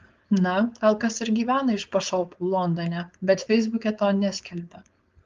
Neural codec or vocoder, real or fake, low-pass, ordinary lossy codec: codec, 16 kHz, 4.8 kbps, FACodec; fake; 7.2 kHz; Opus, 32 kbps